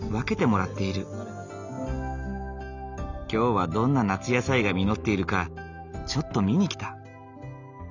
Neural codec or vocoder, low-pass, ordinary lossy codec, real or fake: none; 7.2 kHz; none; real